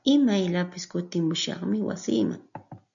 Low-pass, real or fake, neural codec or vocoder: 7.2 kHz; real; none